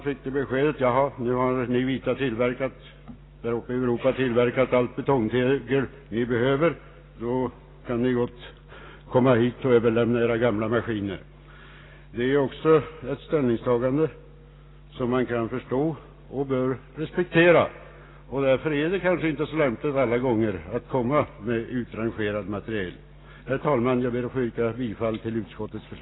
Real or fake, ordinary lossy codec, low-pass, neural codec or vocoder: real; AAC, 16 kbps; 7.2 kHz; none